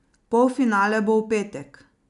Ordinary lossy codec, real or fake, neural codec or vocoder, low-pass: none; real; none; 10.8 kHz